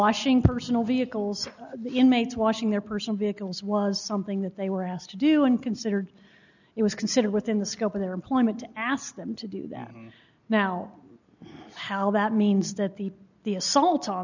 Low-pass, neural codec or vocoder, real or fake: 7.2 kHz; none; real